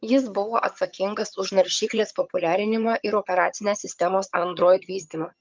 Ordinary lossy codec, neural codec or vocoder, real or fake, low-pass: Opus, 24 kbps; vocoder, 22.05 kHz, 80 mel bands, HiFi-GAN; fake; 7.2 kHz